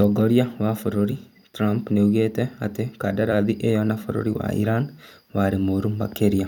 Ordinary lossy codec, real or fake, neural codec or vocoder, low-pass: none; fake; vocoder, 44.1 kHz, 128 mel bands every 256 samples, BigVGAN v2; 19.8 kHz